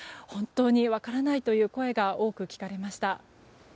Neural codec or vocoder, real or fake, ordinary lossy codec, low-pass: none; real; none; none